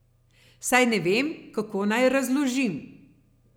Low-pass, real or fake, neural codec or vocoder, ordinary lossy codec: none; real; none; none